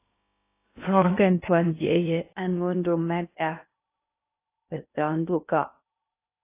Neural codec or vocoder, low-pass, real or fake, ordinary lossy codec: codec, 16 kHz in and 24 kHz out, 0.6 kbps, FocalCodec, streaming, 2048 codes; 3.6 kHz; fake; AAC, 24 kbps